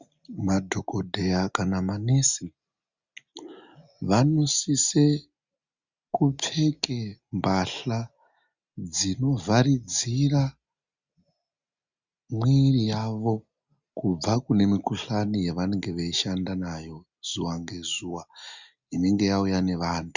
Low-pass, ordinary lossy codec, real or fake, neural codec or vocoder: 7.2 kHz; Opus, 64 kbps; real; none